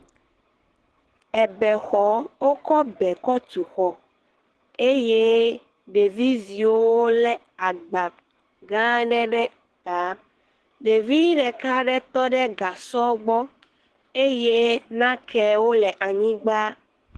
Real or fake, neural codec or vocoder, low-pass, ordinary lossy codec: fake; codec, 44.1 kHz, 2.6 kbps, SNAC; 10.8 kHz; Opus, 16 kbps